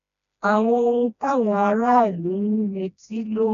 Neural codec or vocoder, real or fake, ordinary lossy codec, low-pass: codec, 16 kHz, 1 kbps, FreqCodec, smaller model; fake; none; 7.2 kHz